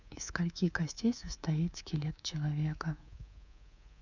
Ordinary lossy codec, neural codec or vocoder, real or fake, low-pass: none; codec, 24 kHz, 3.1 kbps, DualCodec; fake; 7.2 kHz